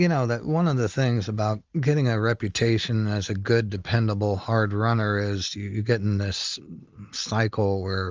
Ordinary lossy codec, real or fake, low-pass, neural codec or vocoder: Opus, 24 kbps; real; 7.2 kHz; none